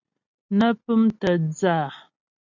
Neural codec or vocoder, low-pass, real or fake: none; 7.2 kHz; real